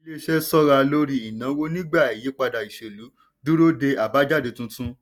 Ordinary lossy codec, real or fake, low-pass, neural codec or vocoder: none; real; 19.8 kHz; none